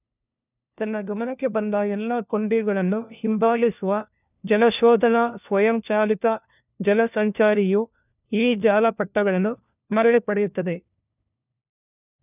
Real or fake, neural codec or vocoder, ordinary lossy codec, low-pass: fake; codec, 16 kHz, 1 kbps, FunCodec, trained on LibriTTS, 50 frames a second; none; 3.6 kHz